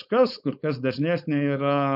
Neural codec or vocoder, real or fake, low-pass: codec, 16 kHz, 4.8 kbps, FACodec; fake; 5.4 kHz